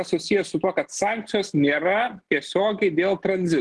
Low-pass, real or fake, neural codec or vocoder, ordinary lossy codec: 10.8 kHz; real; none; Opus, 16 kbps